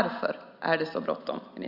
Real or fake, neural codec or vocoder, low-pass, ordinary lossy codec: real; none; 5.4 kHz; Opus, 64 kbps